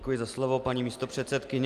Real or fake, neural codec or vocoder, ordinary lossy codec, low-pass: real; none; Opus, 32 kbps; 14.4 kHz